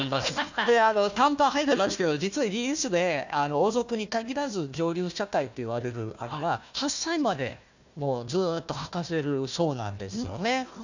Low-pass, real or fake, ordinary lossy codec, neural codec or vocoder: 7.2 kHz; fake; none; codec, 16 kHz, 1 kbps, FunCodec, trained on Chinese and English, 50 frames a second